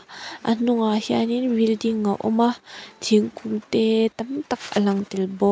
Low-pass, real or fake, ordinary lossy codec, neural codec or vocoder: none; real; none; none